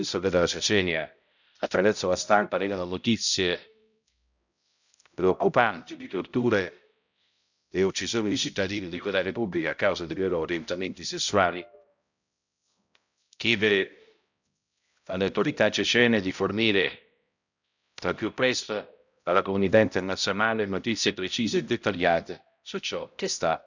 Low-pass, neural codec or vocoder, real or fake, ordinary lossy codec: 7.2 kHz; codec, 16 kHz, 0.5 kbps, X-Codec, HuBERT features, trained on balanced general audio; fake; none